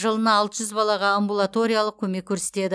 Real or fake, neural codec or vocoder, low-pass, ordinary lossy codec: real; none; none; none